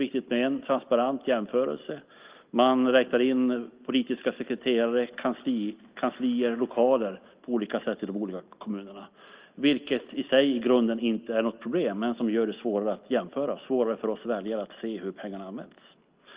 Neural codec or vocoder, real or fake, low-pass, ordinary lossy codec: none; real; 3.6 kHz; Opus, 32 kbps